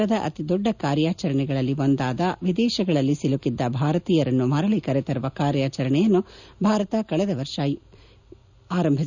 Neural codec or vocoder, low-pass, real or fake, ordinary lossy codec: none; 7.2 kHz; real; none